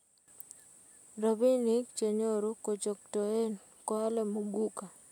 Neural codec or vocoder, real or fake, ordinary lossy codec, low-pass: none; real; none; 19.8 kHz